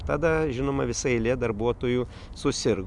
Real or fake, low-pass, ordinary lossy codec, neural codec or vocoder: real; 10.8 kHz; MP3, 96 kbps; none